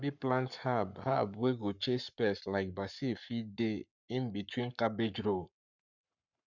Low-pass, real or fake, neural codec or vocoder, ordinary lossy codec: 7.2 kHz; fake; codec, 44.1 kHz, 7.8 kbps, Pupu-Codec; none